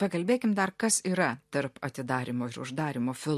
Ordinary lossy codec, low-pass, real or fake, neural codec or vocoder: MP3, 64 kbps; 14.4 kHz; real; none